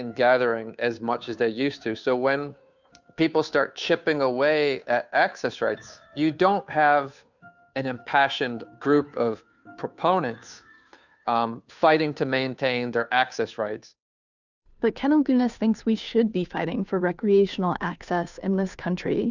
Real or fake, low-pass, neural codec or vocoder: fake; 7.2 kHz; codec, 16 kHz, 2 kbps, FunCodec, trained on Chinese and English, 25 frames a second